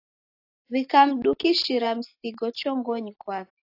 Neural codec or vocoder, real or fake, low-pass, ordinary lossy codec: none; real; 5.4 kHz; AAC, 32 kbps